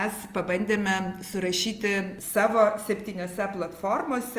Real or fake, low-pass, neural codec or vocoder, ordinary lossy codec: real; 14.4 kHz; none; Opus, 32 kbps